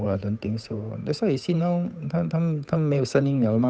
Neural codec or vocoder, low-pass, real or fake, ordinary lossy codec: codec, 16 kHz, 8 kbps, FunCodec, trained on Chinese and English, 25 frames a second; none; fake; none